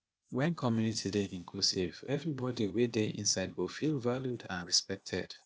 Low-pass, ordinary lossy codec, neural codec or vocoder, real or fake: none; none; codec, 16 kHz, 0.8 kbps, ZipCodec; fake